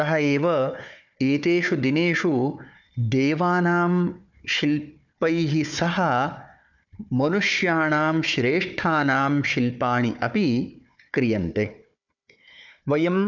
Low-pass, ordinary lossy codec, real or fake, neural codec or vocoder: 7.2 kHz; none; fake; codec, 16 kHz, 4 kbps, FunCodec, trained on Chinese and English, 50 frames a second